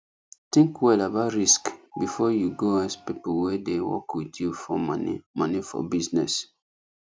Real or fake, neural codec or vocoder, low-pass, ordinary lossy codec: real; none; none; none